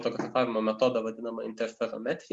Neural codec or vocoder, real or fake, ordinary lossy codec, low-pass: none; real; Opus, 64 kbps; 10.8 kHz